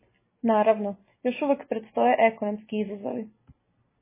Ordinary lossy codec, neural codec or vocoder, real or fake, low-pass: MP3, 16 kbps; none; real; 3.6 kHz